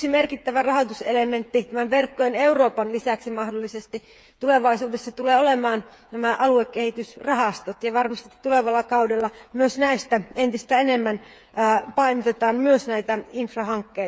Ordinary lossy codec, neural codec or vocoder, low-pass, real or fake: none; codec, 16 kHz, 8 kbps, FreqCodec, smaller model; none; fake